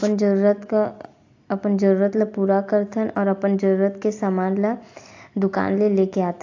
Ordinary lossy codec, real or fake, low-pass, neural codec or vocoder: AAC, 48 kbps; real; 7.2 kHz; none